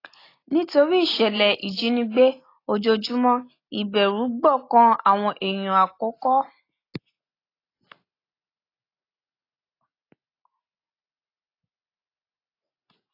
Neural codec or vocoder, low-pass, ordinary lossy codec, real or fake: none; 5.4 kHz; AAC, 24 kbps; real